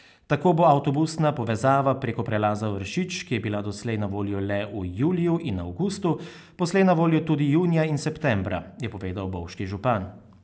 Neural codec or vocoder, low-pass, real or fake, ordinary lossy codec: none; none; real; none